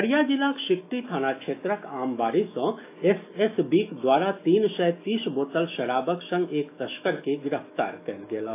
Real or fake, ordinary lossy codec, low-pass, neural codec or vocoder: fake; AAC, 24 kbps; 3.6 kHz; autoencoder, 48 kHz, 128 numbers a frame, DAC-VAE, trained on Japanese speech